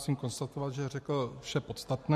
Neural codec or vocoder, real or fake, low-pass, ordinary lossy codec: none; real; 14.4 kHz; MP3, 64 kbps